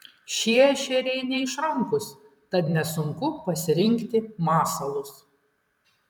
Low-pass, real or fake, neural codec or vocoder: 19.8 kHz; fake; vocoder, 44.1 kHz, 128 mel bands every 512 samples, BigVGAN v2